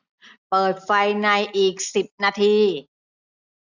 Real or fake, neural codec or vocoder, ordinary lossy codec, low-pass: real; none; none; 7.2 kHz